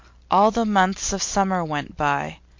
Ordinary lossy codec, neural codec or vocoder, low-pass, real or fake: MP3, 48 kbps; none; 7.2 kHz; real